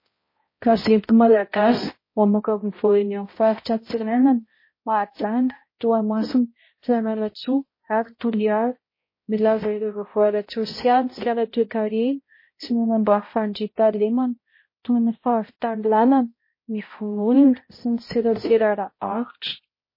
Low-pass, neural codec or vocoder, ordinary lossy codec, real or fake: 5.4 kHz; codec, 16 kHz, 0.5 kbps, X-Codec, HuBERT features, trained on balanced general audio; MP3, 24 kbps; fake